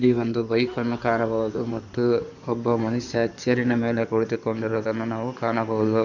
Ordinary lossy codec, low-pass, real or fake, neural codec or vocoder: none; 7.2 kHz; fake; codec, 16 kHz in and 24 kHz out, 2.2 kbps, FireRedTTS-2 codec